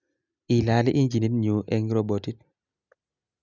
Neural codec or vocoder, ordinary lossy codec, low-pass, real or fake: none; none; 7.2 kHz; real